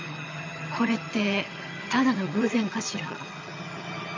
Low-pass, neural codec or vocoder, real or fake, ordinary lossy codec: 7.2 kHz; vocoder, 22.05 kHz, 80 mel bands, HiFi-GAN; fake; MP3, 64 kbps